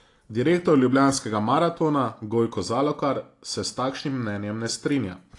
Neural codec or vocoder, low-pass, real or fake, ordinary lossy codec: none; 10.8 kHz; real; AAC, 48 kbps